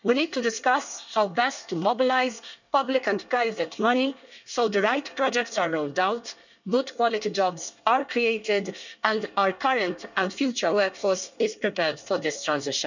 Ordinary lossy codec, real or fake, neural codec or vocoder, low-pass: none; fake; codec, 24 kHz, 1 kbps, SNAC; 7.2 kHz